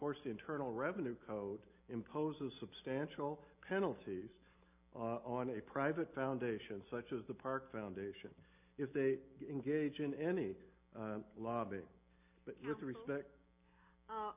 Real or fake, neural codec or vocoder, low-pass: real; none; 3.6 kHz